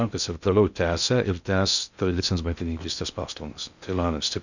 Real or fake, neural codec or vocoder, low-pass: fake; codec, 16 kHz in and 24 kHz out, 0.6 kbps, FocalCodec, streaming, 2048 codes; 7.2 kHz